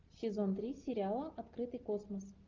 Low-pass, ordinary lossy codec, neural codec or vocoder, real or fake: 7.2 kHz; Opus, 24 kbps; none; real